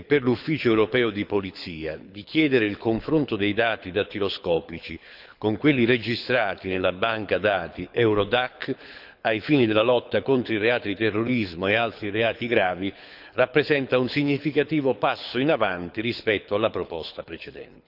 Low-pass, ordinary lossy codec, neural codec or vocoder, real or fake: 5.4 kHz; none; codec, 24 kHz, 6 kbps, HILCodec; fake